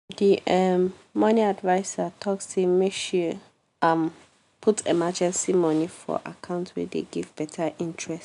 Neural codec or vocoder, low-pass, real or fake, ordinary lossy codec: none; 10.8 kHz; real; none